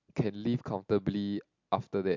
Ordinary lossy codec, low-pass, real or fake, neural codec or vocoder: MP3, 64 kbps; 7.2 kHz; real; none